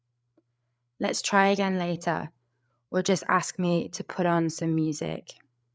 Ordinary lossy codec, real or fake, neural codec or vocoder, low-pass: none; fake; codec, 16 kHz, 8 kbps, FreqCodec, larger model; none